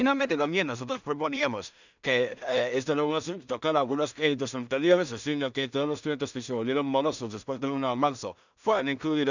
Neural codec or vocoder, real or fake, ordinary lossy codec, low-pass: codec, 16 kHz in and 24 kHz out, 0.4 kbps, LongCat-Audio-Codec, two codebook decoder; fake; none; 7.2 kHz